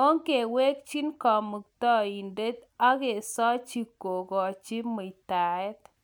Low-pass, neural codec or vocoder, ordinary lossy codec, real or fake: none; none; none; real